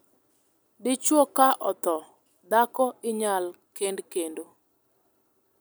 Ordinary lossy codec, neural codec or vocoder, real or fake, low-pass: none; none; real; none